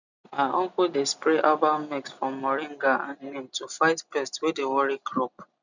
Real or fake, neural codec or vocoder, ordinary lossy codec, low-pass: real; none; none; 7.2 kHz